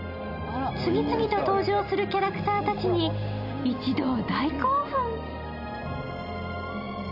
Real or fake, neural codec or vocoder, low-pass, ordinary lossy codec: real; none; 5.4 kHz; none